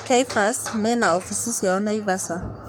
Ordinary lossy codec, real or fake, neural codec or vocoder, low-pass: none; fake; codec, 44.1 kHz, 3.4 kbps, Pupu-Codec; none